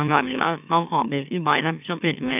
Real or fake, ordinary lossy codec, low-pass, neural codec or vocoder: fake; none; 3.6 kHz; autoencoder, 44.1 kHz, a latent of 192 numbers a frame, MeloTTS